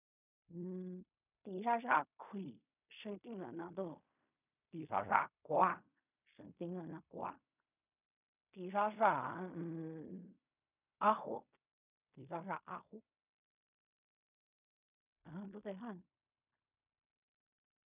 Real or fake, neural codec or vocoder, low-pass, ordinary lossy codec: fake; codec, 16 kHz in and 24 kHz out, 0.4 kbps, LongCat-Audio-Codec, fine tuned four codebook decoder; 3.6 kHz; none